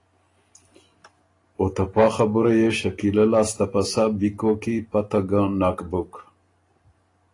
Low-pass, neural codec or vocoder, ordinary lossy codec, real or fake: 10.8 kHz; none; AAC, 32 kbps; real